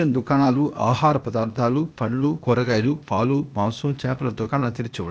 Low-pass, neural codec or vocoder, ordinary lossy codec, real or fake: none; codec, 16 kHz, 0.8 kbps, ZipCodec; none; fake